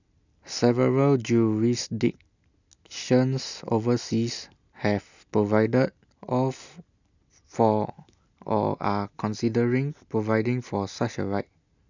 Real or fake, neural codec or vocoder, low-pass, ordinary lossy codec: real; none; 7.2 kHz; none